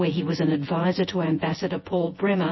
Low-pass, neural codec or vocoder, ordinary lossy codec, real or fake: 7.2 kHz; vocoder, 24 kHz, 100 mel bands, Vocos; MP3, 24 kbps; fake